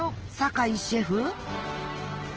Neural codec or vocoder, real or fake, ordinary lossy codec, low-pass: none; real; Opus, 16 kbps; 7.2 kHz